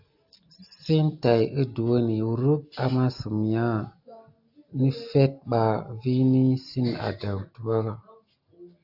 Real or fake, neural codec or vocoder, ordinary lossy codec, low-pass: real; none; AAC, 48 kbps; 5.4 kHz